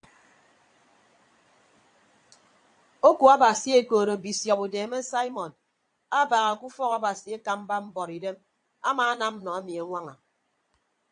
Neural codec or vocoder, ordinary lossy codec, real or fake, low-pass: vocoder, 22.05 kHz, 80 mel bands, Vocos; AAC, 64 kbps; fake; 9.9 kHz